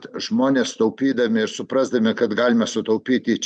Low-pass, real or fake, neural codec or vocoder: 9.9 kHz; real; none